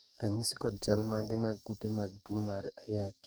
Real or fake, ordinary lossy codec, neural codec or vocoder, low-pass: fake; none; codec, 44.1 kHz, 2.6 kbps, DAC; none